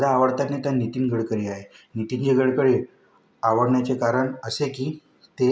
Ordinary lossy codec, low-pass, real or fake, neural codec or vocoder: none; none; real; none